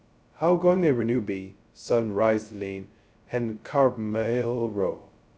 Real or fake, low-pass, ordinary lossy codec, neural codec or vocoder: fake; none; none; codec, 16 kHz, 0.2 kbps, FocalCodec